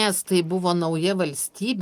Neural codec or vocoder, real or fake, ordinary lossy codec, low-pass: none; real; Opus, 32 kbps; 14.4 kHz